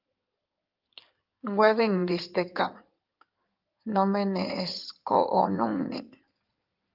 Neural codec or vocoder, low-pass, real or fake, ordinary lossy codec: codec, 16 kHz in and 24 kHz out, 2.2 kbps, FireRedTTS-2 codec; 5.4 kHz; fake; Opus, 24 kbps